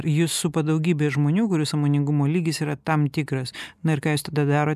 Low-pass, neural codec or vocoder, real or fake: 14.4 kHz; none; real